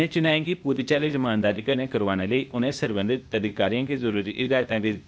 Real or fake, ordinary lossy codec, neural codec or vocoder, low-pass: fake; none; codec, 16 kHz, 0.8 kbps, ZipCodec; none